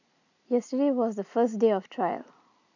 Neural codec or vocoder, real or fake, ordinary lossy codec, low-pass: none; real; none; 7.2 kHz